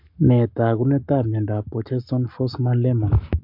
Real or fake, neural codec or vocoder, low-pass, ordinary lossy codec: fake; codec, 44.1 kHz, 7.8 kbps, Pupu-Codec; 5.4 kHz; MP3, 48 kbps